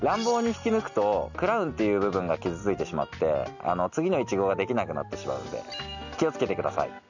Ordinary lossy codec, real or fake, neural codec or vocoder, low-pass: none; real; none; 7.2 kHz